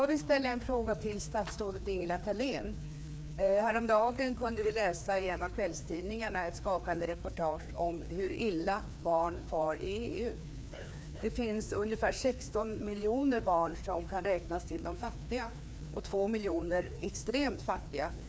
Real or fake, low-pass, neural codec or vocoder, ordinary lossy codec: fake; none; codec, 16 kHz, 2 kbps, FreqCodec, larger model; none